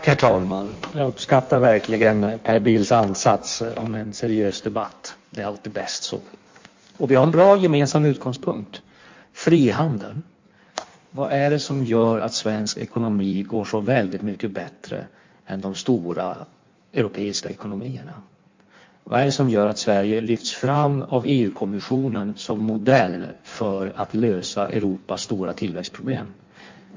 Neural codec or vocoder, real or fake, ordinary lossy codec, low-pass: codec, 16 kHz in and 24 kHz out, 1.1 kbps, FireRedTTS-2 codec; fake; MP3, 48 kbps; 7.2 kHz